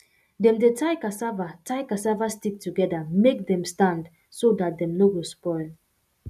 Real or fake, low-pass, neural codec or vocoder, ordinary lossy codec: real; 14.4 kHz; none; none